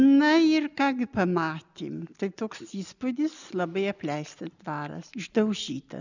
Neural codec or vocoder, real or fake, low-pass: none; real; 7.2 kHz